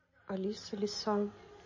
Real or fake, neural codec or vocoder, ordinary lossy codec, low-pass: real; none; MP3, 32 kbps; 7.2 kHz